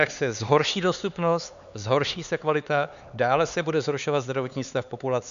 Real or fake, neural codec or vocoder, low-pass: fake; codec, 16 kHz, 4 kbps, X-Codec, HuBERT features, trained on LibriSpeech; 7.2 kHz